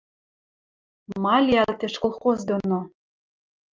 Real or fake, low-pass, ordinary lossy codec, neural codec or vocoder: real; 7.2 kHz; Opus, 24 kbps; none